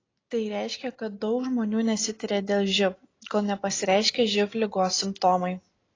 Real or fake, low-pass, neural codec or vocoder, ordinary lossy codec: real; 7.2 kHz; none; AAC, 32 kbps